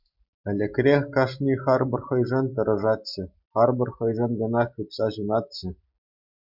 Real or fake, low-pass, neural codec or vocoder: real; 5.4 kHz; none